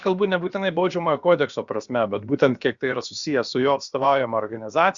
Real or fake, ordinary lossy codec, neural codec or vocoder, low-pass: fake; Opus, 64 kbps; codec, 16 kHz, about 1 kbps, DyCAST, with the encoder's durations; 7.2 kHz